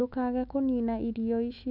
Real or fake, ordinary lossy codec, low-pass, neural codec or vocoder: fake; none; 5.4 kHz; autoencoder, 48 kHz, 128 numbers a frame, DAC-VAE, trained on Japanese speech